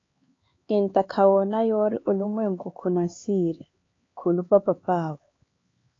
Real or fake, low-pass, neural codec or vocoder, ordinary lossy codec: fake; 7.2 kHz; codec, 16 kHz, 4 kbps, X-Codec, HuBERT features, trained on LibriSpeech; AAC, 32 kbps